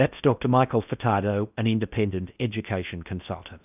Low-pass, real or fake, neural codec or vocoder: 3.6 kHz; fake; codec, 16 kHz in and 24 kHz out, 0.6 kbps, FocalCodec, streaming, 4096 codes